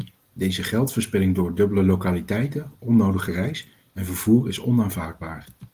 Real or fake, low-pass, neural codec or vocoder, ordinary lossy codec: real; 14.4 kHz; none; Opus, 24 kbps